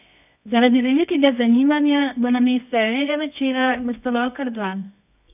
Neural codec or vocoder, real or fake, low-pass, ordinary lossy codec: codec, 24 kHz, 0.9 kbps, WavTokenizer, medium music audio release; fake; 3.6 kHz; none